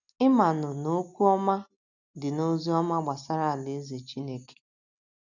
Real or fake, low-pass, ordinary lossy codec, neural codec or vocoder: real; 7.2 kHz; none; none